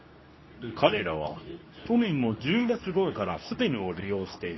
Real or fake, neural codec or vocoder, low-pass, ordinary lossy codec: fake; codec, 24 kHz, 0.9 kbps, WavTokenizer, medium speech release version 2; 7.2 kHz; MP3, 24 kbps